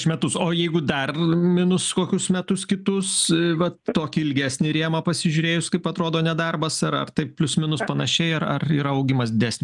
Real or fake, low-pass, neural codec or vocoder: real; 10.8 kHz; none